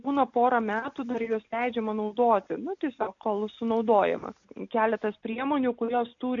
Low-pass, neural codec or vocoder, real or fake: 7.2 kHz; none; real